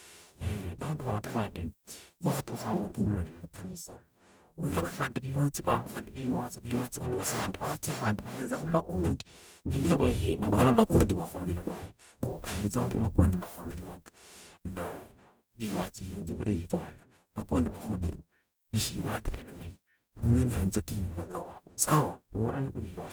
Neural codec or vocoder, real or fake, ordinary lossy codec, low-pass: codec, 44.1 kHz, 0.9 kbps, DAC; fake; none; none